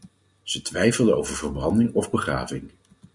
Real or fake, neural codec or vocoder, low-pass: real; none; 10.8 kHz